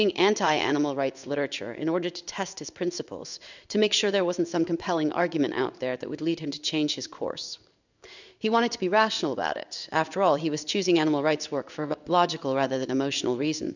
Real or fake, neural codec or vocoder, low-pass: fake; codec, 16 kHz in and 24 kHz out, 1 kbps, XY-Tokenizer; 7.2 kHz